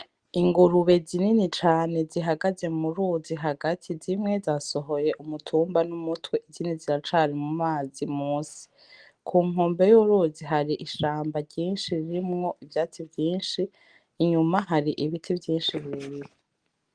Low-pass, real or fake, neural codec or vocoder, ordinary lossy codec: 9.9 kHz; real; none; Opus, 24 kbps